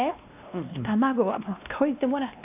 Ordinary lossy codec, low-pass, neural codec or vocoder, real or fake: none; 3.6 kHz; codec, 16 kHz, 1 kbps, X-Codec, WavLM features, trained on Multilingual LibriSpeech; fake